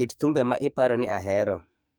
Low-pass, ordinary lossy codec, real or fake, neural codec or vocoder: none; none; fake; codec, 44.1 kHz, 2.6 kbps, SNAC